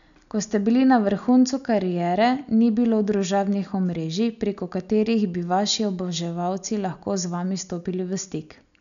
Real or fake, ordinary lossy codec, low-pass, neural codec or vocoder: real; none; 7.2 kHz; none